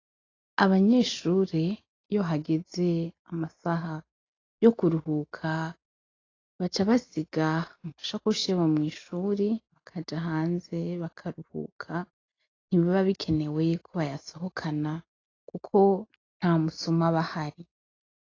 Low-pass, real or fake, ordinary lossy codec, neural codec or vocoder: 7.2 kHz; real; AAC, 32 kbps; none